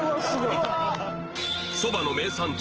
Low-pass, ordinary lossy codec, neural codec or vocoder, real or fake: 7.2 kHz; Opus, 16 kbps; none; real